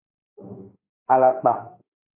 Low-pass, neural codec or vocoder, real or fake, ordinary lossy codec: 3.6 kHz; autoencoder, 48 kHz, 32 numbers a frame, DAC-VAE, trained on Japanese speech; fake; MP3, 24 kbps